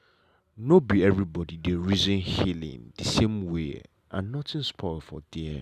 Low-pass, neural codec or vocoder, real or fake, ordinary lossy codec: 14.4 kHz; none; real; none